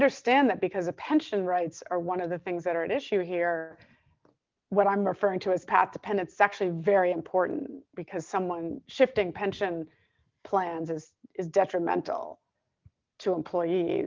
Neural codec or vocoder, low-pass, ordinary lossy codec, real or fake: none; 7.2 kHz; Opus, 24 kbps; real